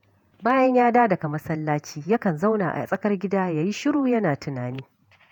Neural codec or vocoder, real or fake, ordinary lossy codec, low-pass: vocoder, 48 kHz, 128 mel bands, Vocos; fake; none; 19.8 kHz